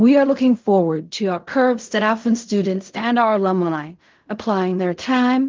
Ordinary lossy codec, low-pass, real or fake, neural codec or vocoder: Opus, 32 kbps; 7.2 kHz; fake; codec, 16 kHz in and 24 kHz out, 0.4 kbps, LongCat-Audio-Codec, fine tuned four codebook decoder